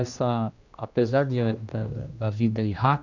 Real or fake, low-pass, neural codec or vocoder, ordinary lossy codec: fake; 7.2 kHz; codec, 16 kHz, 1 kbps, X-Codec, HuBERT features, trained on general audio; none